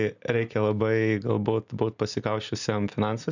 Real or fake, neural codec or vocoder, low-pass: real; none; 7.2 kHz